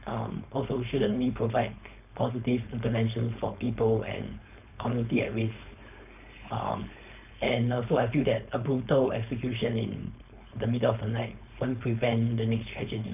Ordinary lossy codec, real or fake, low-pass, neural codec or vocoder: none; fake; 3.6 kHz; codec, 16 kHz, 4.8 kbps, FACodec